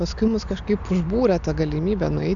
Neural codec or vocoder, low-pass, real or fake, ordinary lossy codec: none; 7.2 kHz; real; AAC, 64 kbps